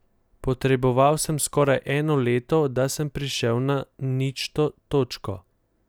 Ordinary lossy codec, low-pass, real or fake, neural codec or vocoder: none; none; real; none